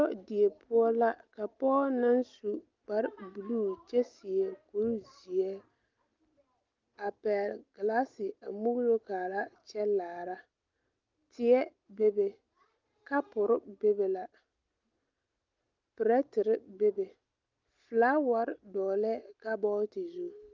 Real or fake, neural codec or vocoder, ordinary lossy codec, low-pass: real; none; Opus, 32 kbps; 7.2 kHz